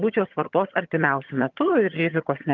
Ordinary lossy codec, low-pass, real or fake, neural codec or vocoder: Opus, 24 kbps; 7.2 kHz; fake; vocoder, 22.05 kHz, 80 mel bands, HiFi-GAN